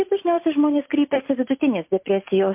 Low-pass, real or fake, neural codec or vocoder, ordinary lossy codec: 3.6 kHz; real; none; MP3, 32 kbps